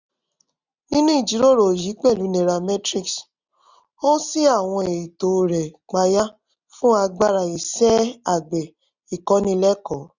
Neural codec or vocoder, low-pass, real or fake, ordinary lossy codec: none; 7.2 kHz; real; none